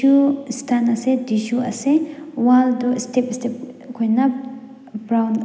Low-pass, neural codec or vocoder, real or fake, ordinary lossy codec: none; none; real; none